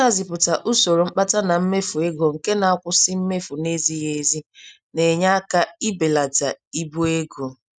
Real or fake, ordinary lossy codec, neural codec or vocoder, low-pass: real; none; none; 9.9 kHz